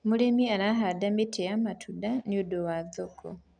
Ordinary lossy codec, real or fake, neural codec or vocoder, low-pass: none; real; none; 9.9 kHz